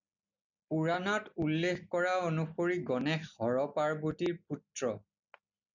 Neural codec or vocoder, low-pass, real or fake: none; 7.2 kHz; real